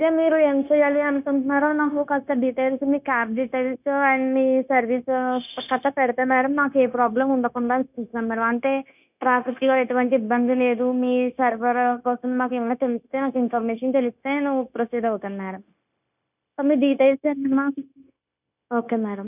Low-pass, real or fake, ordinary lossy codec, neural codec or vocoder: 3.6 kHz; fake; none; codec, 16 kHz, 0.9 kbps, LongCat-Audio-Codec